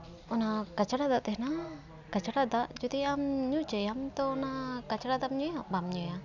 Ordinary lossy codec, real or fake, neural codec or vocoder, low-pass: none; real; none; 7.2 kHz